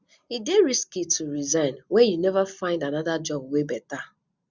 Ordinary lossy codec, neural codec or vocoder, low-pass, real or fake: Opus, 64 kbps; none; 7.2 kHz; real